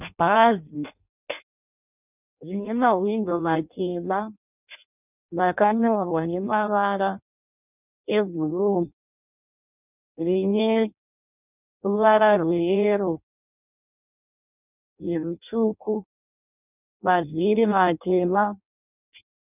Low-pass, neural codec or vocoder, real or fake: 3.6 kHz; codec, 16 kHz in and 24 kHz out, 0.6 kbps, FireRedTTS-2 codec; fake